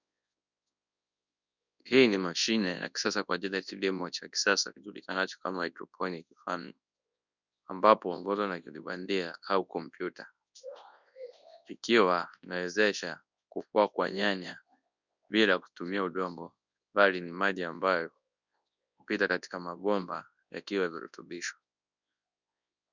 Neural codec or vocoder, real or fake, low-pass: codec, 24 kHz, 0.9 kbps, WavTokenizer, large speech release; fake; 7.2 kHz